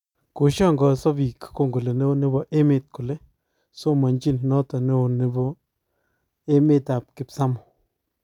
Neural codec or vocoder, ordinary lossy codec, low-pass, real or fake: none; none; 19.8 kHz; real